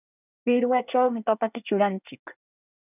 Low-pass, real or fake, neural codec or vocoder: 3.6 kHz; fake; codec, 24 kHz, 1 kbps, SNAC